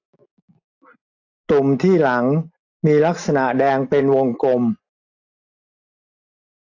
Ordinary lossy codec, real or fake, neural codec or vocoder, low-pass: AAC, 48 kbps; real; none; 7.2 kHz